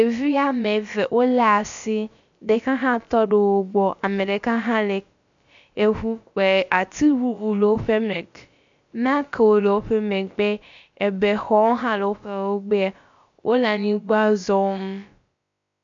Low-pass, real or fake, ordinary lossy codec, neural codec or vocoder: 7.2 kHz; fake; MP3, 64 kbps; codec, 16 kHz, about 1 kbps, DyCAST, with the encoder's durations